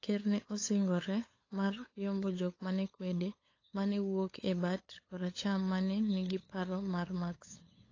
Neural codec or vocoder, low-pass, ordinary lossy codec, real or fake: codec, 16 kHz, 8 kbps, FunCodec, trained on LibriTTS, 25 frames a second; 7.2 kHz; AAC, 32 kbps; fake